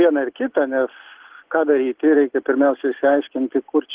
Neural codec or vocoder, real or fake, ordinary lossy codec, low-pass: none; real; Opus, 16 kbps; 3.6 kHz